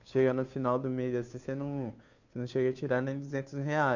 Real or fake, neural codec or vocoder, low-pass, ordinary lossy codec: fake; codec, 16 kHz, 2 kbps, FunCodec, trained on Chinese and English, 25 frames a second; 7.2 kHz; none